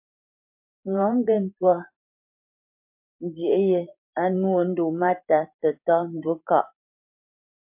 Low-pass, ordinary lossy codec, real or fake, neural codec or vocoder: 3.6 kHz; MP3, 32 kbps; fake; vocoder, 44.1 kHz, 128 mel bands every 512 samples, BigVGAN v2